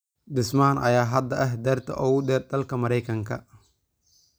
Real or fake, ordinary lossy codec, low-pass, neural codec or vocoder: real; none; none; none